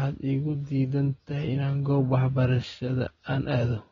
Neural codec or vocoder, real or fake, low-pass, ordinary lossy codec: none; real; 7.2 kHz; AAC, 24 kbps